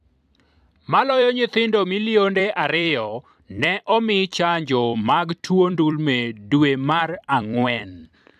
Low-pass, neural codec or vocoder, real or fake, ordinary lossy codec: 14.4 kHz; vocoder, 44.1 kHz, 128 mel bands every 256 samples, BigVGAN v2; fake; AAC, 96 kbps